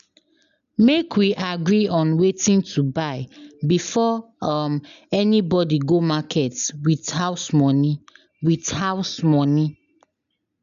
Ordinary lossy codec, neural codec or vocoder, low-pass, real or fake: none; none; 7.2 kHz; real